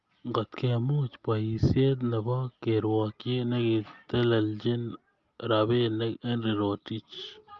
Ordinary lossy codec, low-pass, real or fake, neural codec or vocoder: Opus, 32 kbps; 7.2 kHz; real; none